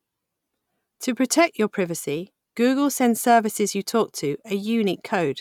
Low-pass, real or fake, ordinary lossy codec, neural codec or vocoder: 19.8 kHz; real; none; none